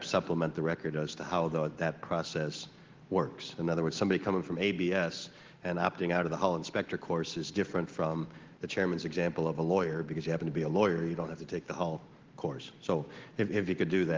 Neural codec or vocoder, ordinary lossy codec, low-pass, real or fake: none; Opus, 16 kbps; 7.2 kHz; real